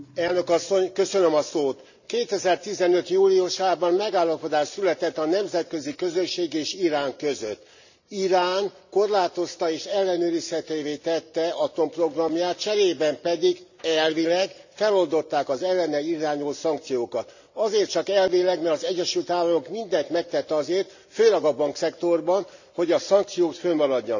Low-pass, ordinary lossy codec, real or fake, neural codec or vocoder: 7.2 kHz; none; real; none